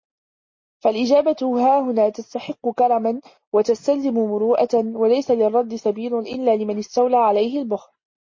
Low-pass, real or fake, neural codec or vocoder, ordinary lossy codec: 7.2 kHz; real; none; MP3, 32 kbps